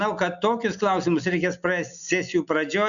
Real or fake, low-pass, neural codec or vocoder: real; 7.2 kHz; none